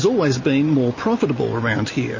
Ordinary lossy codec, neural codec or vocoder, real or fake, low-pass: MP3, 32 kbps; none; real; 7.2 kHz